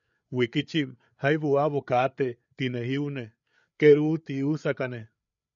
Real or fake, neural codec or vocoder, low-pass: fake; codec, 16 kHz, 8 kbps, FreqCodec, larger model; 7.2 kHz